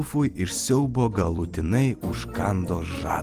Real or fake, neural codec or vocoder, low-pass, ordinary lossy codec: fake; vocoder, 44.1 kHz, 128 mel bands every 256 samples, BigVGAN v2; 14.4 kHz; Opus, 24 kbps